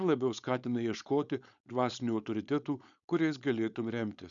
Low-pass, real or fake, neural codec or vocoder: 7.2 kHz; fake; codec, 16 kHz, 4.8 kbps, FACodec